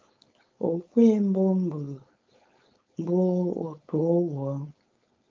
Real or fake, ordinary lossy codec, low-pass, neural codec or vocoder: fake; Opus, 24 kbps; 7.2 kHz; codec, 16 kHz, 4.8 kbps, FACodec